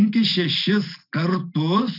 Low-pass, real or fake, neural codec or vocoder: 5.4 kHz; real; none